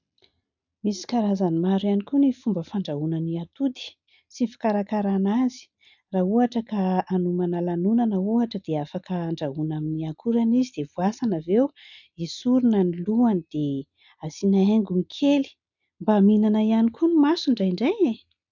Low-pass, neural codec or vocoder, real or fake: 7.2 kHz; none; real